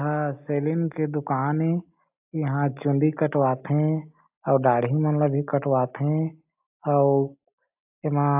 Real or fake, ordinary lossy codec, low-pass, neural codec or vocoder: real; AAC, 32 kbps; 3.6 kHz; none